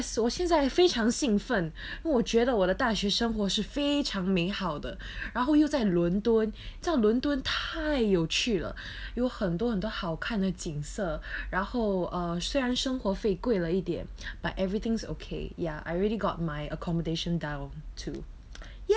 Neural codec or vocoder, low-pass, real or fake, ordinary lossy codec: none; none; real; none